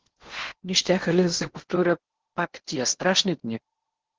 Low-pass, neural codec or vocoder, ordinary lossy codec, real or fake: 7.2 kHz; codec, 16 kHz in and 24 kHz out, 0.8 kbps, FocalCodec, streaming, 65536 codes; Opus, 16 kbps; fake